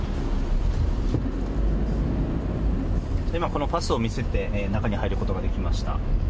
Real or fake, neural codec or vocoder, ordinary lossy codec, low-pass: real; none; none; none